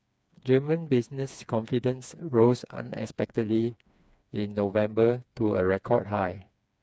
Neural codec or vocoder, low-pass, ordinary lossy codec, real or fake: codec, 16 kHz, 4 kbps, FreqCodec, smaller model; none; none; fake